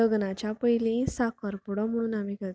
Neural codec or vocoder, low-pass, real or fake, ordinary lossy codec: none; none; real; none